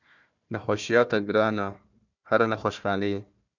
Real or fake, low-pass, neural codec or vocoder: fake; 7.2 kHz; codec, 16 kHz, 1 kbps, FunCodec, trained on Chinese and English, 50 frames a second